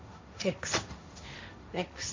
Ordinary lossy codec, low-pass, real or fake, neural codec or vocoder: MP3, 48 kbps; 7.2 kHz; fake; codec, 16 kHz, 1.1 kbps, Voila-Tokenizer